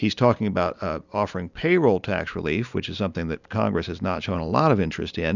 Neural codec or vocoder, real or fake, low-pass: none; real; 7.2 kHz